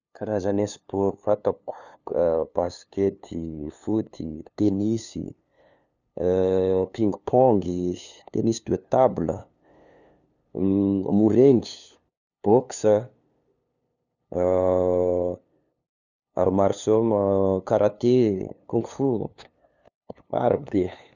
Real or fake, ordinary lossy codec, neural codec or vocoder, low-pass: fake; none; codec, 16 kHz, 2 kbps, FunCodec, trained on LibriTTS, 25 frames a second; 7.2 kHz